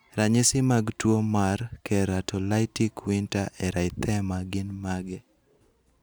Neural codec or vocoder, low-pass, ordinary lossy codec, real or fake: none; none; none; real